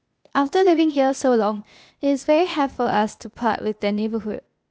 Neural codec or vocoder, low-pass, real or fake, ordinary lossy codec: codec, 16 kHz, 0.8 kbps, ZipCodec; none; fake; none